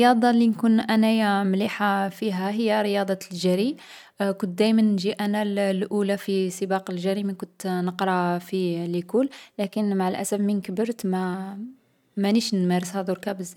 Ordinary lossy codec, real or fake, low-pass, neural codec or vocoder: none; real; 19.8 kHz; none